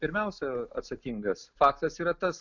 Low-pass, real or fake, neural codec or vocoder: 7.2 kHz; real; none